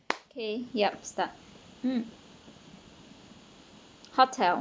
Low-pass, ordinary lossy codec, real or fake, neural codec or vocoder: none; none; real; none